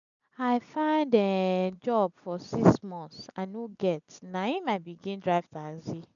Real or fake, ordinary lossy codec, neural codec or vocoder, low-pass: real; none; none; 7.2 kHz